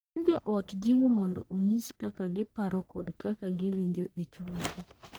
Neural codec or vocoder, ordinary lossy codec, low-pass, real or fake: codec, 44.1 kHz, 1.7 kbps, Pupu-Codec; none; none; fake